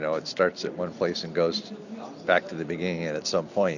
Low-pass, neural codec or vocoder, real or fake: 7.2 kHz; none; real